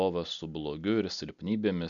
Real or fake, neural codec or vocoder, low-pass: real; none; 7.2 kHz